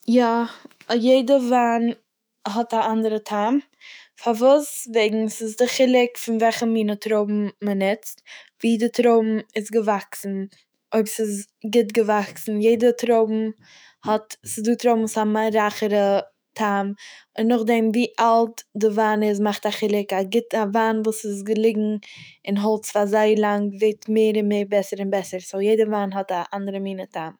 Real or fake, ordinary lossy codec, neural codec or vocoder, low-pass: fake; none; autoencoder, 48 kHz, 128 numbers a frame, DAC-VAE, trained on Japanese speech; none